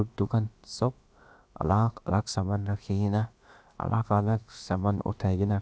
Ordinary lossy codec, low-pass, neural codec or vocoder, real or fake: none; none; codec, 16 kHz, about 1 kbps, DyCAST, with the encoder's durations; fake